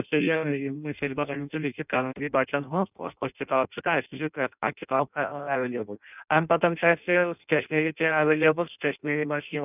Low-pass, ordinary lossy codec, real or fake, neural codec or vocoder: 3.6 kHz; none; fake; codec, 16 kHz in and 24 kHz out, 0.6 kbps, FireRedTTS-2 codec